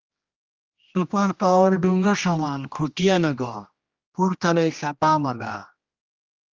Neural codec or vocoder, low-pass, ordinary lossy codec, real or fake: codec, 16 kHz, 1 kbps, X-Codec, HuBERT features, trained on general audio; 7.2 kHz; Opus, 32 kbps; fake